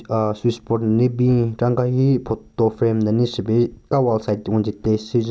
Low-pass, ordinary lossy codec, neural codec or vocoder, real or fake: none; none; none; real